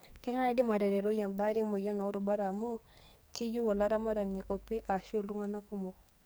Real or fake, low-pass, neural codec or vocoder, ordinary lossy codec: fake; none; codec, 44.1 kHz, 2.6 kbps, SNAC; none